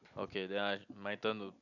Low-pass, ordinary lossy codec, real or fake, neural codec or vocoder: 7.2 kHz; none; real; none